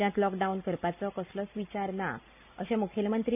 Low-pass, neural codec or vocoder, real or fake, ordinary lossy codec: 3.6 kHz; none; real; none